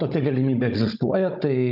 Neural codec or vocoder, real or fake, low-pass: codec, 16 kHz, 16 kbps, FunCodec, trained on Chinese and English, 50 frames a second; fake; 5.4 kHz